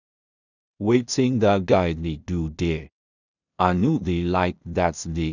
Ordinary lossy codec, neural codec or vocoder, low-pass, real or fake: none; codec, 16 kHz in and 24 kHz out, 0.4 kbps, LongCat-Audio-Codec, two codebook decoder; 7.2 kHz; fake